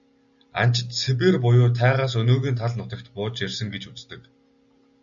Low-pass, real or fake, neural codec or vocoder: 7.2 kHz; real; none